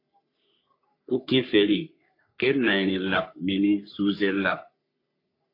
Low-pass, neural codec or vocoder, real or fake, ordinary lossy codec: 5.4 kHz; codec, 44.1 kHz, 3.4 kbps, Pupu-Codec; fake; AAC, 32 kbps